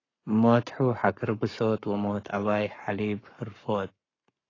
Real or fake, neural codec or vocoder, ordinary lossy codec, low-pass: fake; codec, 44.1 kHz, 7.8 kbps, Pupu-Codec; AAC, 32 kbps; 7.2 kHz